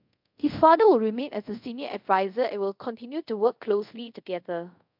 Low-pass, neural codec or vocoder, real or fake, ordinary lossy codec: 5.4 kHz; codec, 24 kHz, 0.5 kbps, DualCodec; fake; MP3, 48 kbps